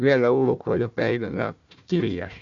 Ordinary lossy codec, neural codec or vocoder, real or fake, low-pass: MP3, 64 kbps; codec, 16 kHz, 1 kbps, FunCodec, trained on Chinese and English, 50 frames a second; fake; 7.2 kHz